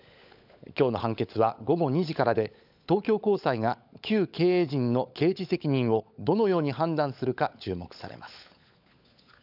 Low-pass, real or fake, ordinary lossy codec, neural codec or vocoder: 5.4 kHz; fake; none; codec, 16 kHz, 16 kbps, FunCodec, trained on LibriTTS, 50 frames a second